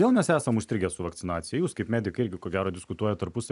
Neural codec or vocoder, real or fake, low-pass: none; real; 10.8 kHz